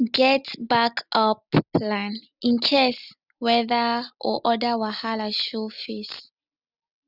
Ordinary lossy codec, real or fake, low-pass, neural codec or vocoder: none; real; 5.4 kHz; none